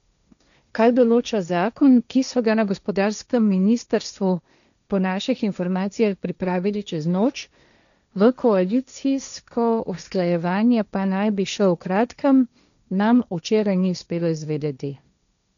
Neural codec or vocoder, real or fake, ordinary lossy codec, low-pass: codec, 16 kHz, 1.1 kbps, Voila-Tokenizer; fake; none; 7.2 kHz